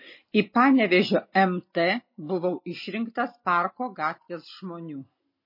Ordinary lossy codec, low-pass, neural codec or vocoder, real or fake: MP3, 24 kbps; 5.4 kHz; none; real